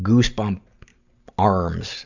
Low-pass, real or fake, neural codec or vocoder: 7.2 kHz; real; none